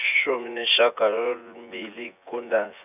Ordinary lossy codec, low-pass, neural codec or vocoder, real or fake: none; 3.6 kHz; vocoder, 24 kHz, 100 mel bands, Vocos; fake